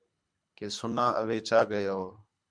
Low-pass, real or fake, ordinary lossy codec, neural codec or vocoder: 9.9 kHz; fake; MP3, 96 kbps; codec, 24 kHz, 3 kbps, HILCodec